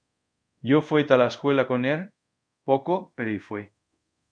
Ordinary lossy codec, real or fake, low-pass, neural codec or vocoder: MP3, 96 kbps; fake; 9.9 kHz; codec, 24 kHz, 0.5 kbps, DualCodec